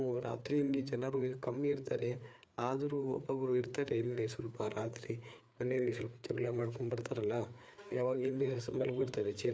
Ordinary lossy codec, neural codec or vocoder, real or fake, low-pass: none; codec, 16 kHz, 4 kbps, FreqCodec, larger model; fake; none